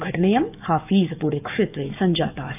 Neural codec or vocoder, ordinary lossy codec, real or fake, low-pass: codec, 44.1 kHz, 7.8 kbps, DAC; none; fake; 3.6 kHz